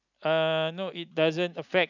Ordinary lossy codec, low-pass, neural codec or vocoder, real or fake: none; 7.2 kHz; none; real